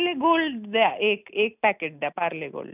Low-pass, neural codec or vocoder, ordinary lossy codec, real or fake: 3.6 kHz; none; none; real